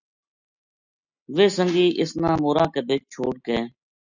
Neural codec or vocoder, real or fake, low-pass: none; real; 7.2 kHz